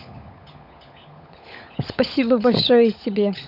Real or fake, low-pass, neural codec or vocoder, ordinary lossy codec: fake; 5.4 kHz; codec, 16 kHz, 16 kbps, FunCodec, trained on LibriTTS, 50 frames a second; none